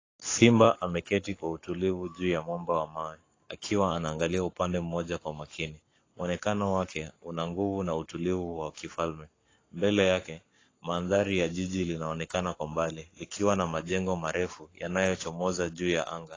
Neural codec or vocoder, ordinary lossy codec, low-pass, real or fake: codec, 44.1 kHz, 7.8 kbps, Pupu-Codec; AAC, 32 kbps; 7.2 kHz; fake